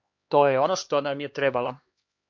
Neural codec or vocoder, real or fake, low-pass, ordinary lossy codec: codec, 16 kHz, 2 kbps, X-Codec, HuBERT features, trained on LibriSpeech; fake; 7.2 kHz; MP3, 48 kbps